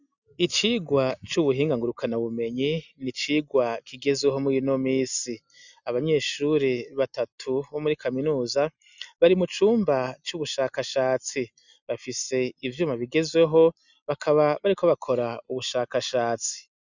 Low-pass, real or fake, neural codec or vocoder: 7.2 kHz; real; none